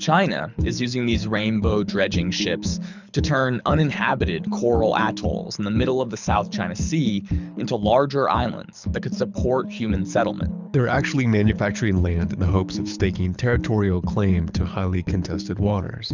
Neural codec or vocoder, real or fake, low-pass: codec, 24 kHz, 6 kbps, HILCodec; fake; 7.2 kHz